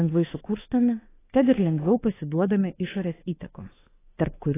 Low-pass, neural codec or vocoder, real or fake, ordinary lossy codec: 3.6 kHz; autoencoder, 48 kHz, 32 numbers a frame, DAC-VAE, trained on Japanese speech; fake; AAC, 16 kbps